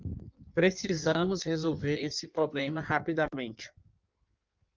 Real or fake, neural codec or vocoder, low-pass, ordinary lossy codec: fake; codec, 16 kHz in and 24 kHz out, 1.1 kbps, FireRedTTS-2 codec; 7.2 kHz; Opus, 24 kbps